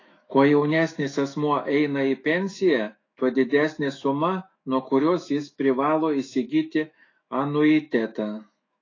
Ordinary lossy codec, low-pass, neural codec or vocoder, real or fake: AAC, 32 kbps; 7.2 kHz; autoencoder, 48 kHz, 128 numbers a frame, DAC-VAE, trained on Japanese speech; fake